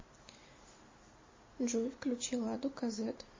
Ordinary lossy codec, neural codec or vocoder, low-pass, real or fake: MP3, 32 kbps; none; 7.2 kHz; real